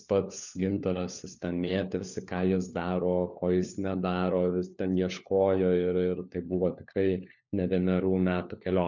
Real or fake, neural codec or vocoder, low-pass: fake; codec, 16 kHz, 4 kbps, FunCodec, trained on LibriTTS, 50 frames a second; 7.2 kHz